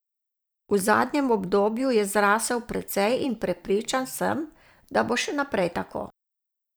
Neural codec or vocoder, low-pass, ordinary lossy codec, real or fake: vocoder, 44.1 kHz, 128 mel bands every 512 samples, BigVGAN v2; none; none; fake